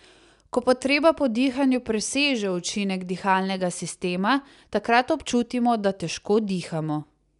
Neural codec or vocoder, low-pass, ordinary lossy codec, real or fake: none; 10.8 kHz; none; real